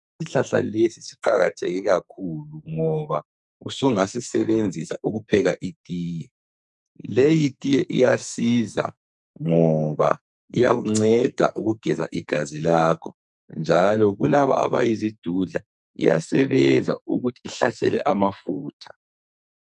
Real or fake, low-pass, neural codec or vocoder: fake; 10.8 kHz; codec, 44.1 kHz, 2.6 kbps, SNAC